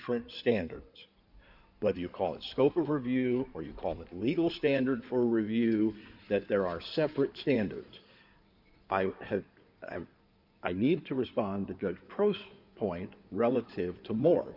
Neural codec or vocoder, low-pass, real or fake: codec, 16 kHz in and 24 kHz out, 2.2 kbps, FireRedTTS-2 codec; 5.4 kHz; fake